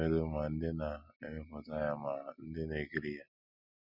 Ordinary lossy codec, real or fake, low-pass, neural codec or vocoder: none; real; 5.4 kHz; none